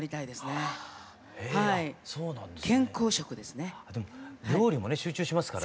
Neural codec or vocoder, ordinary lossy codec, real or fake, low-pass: none; none; real; none